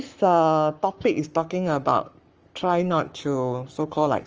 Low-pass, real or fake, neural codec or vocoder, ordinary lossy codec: 7.2 kHz; fake; codec, 44.1 kHz, 7.8 kbps, Pupu-Codec; Opus, 24 kbps